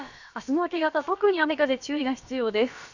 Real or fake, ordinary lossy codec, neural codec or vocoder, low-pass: fake; none; codec, 16 kHz, about 1 kbps, DyCAST, with the encoder's durations; 7.2 kHz